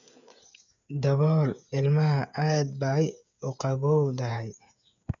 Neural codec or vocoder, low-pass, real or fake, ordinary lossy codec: codec, 16 kHz, 8 kbps, FreqCodec, smaller model; 7.2 kHz; fake; none